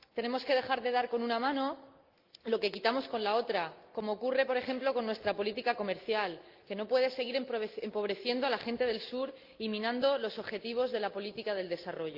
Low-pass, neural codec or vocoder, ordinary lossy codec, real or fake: 5.4 kHz; none; Opus, 32 kbps; real